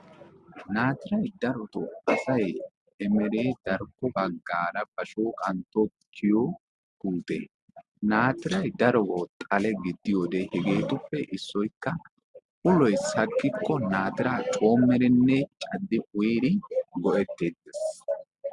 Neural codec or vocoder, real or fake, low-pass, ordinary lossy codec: none; real; 10.8 kHz; Opus, 64 kbps